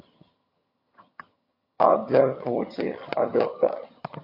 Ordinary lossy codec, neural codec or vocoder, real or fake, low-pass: MP3, 32 kbps; vocoder, 22.05 kHz, 80 mel bands, HiFi-GAN; fake; 5.4 kHz